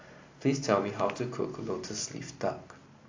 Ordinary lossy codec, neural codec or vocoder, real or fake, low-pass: AAC, 48 kbps; none; real; 7.2 kHz